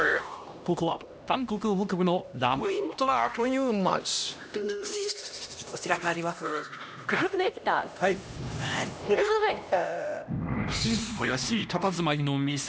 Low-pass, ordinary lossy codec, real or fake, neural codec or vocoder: none; none; fake; codec, 16 kHz, 1 kbps, X-Codec, HuBERT features, trained on LibriSpeech